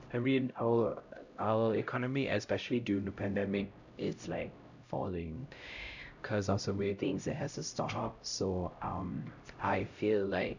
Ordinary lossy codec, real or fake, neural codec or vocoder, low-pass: none; fake; codec, 16 kHz, 0.5 kbps, X-Codec, HuBERT features, trained on LibriSpeech; 7.2 kHz